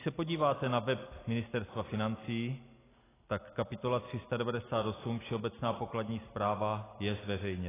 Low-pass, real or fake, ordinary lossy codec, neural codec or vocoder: 3.6 kHz; real; AAC, 16 kbps; none